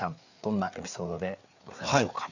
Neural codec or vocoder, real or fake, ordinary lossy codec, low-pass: codec, 16 kHz, 4 kbps, FreqCodec, larger model; fake; none; 7.2 kHz